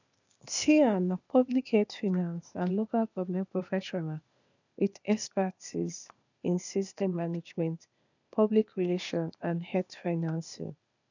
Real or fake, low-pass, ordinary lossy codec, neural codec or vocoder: fake; 7.2 kHz; none; codec, 16 kHz, 0.8 kbps, ZipCodec